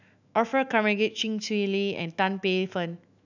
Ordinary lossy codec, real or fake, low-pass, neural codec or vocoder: none; fake; 7.2 kHz; autoencoder, 48 kHz, 128 numbers a frame, DAC-VAE, trained on Japanese speech